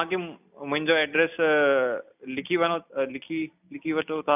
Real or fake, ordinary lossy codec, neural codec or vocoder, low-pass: real; none; none; 3.6 kHz